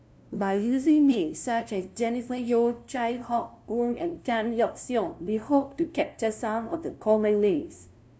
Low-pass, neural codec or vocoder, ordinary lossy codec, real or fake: none; codec, 16 kHz, 0.5 kbps, FunCodec, trained on LibriTTS, 25 frames a second; none; fake